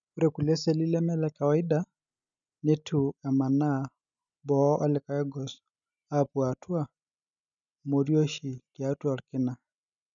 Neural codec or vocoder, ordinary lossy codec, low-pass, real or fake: none; none; 7.2 kHz; real